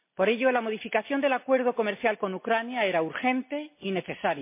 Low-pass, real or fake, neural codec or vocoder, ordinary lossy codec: 3.6 kHz; real; none; MP3, 24 kbps